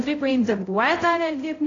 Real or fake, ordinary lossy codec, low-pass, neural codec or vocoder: fake; AAC, 32 kbps; 7.2 kHz; codec, 16 kHz, 0.5 kbps, X-Codec, HuBERT features, trained on balanced general audio